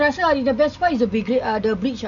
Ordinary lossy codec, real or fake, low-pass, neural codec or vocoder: none; real; 7.2 kHz; none